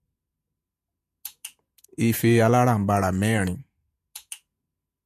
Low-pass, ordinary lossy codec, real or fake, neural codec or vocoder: 14.4 kHz; MP3, 64 kbps; fake; vocoder, 48 kHz, 128 mel bands, Vocos